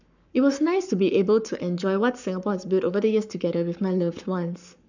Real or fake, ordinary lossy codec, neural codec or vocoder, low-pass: fake; none; codec, 44.1 kHz, 7.8 kbps, Pupu-Codec; 7.2 kHz